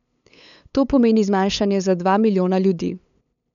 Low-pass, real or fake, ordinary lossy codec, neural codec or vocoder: 7.2 kHz; fake; none; codec, 16 kHz, 8 kbps, FunCodec, trained on LibriTTS, 25 frames a second